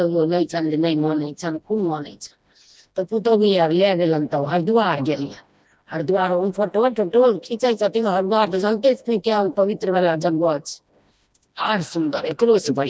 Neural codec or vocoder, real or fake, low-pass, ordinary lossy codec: codec, 16 kHz, 1 kbps, FreqCodec, smaller model; fake; none; none